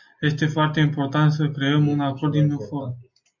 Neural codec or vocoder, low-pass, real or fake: none; 7.2 kHz; real